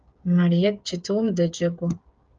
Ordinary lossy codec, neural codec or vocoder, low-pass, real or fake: Opus, 24 kbps; codec, 16 kHz, 8 kbps, FreqCodec, smaller model; 7.2 kHz; fake